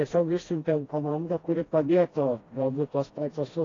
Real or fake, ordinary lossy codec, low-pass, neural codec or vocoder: fake; AAC, 32 kbps; 7.2 kHz; codec, 16 kHz, 1 kbps, FreqCodec, smaller model